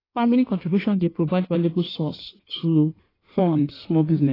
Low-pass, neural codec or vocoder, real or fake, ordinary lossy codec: 5.4 kHz; codec, 16 kHz in and 24 kHz out, 1.1 kbps, FireRedTTS-2 codec; fake; AAC, 24 kbps